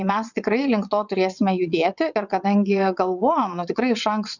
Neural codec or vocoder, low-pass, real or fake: vocoder, 22.05 kHz, 80 mel bands, WaveNeXt; 7.2 kHz; fake